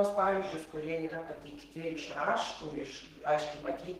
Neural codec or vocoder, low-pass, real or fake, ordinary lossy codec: codec, 32 kHz, 1.9 kbps, SNAC; 14.4 kHz; fake; Opus, 16 kbps